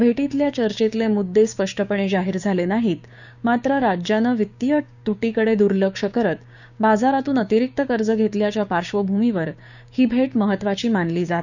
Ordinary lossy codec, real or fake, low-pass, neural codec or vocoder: none; fake; 7.2 kHz; codec, 16 kHz, 6 kbps, DAC